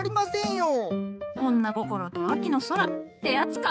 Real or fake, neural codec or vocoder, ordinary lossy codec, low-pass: fake; codec, 16 kHz, 4 kbps, X-Codec, HuBERT features, trained on balanced general audio; none; none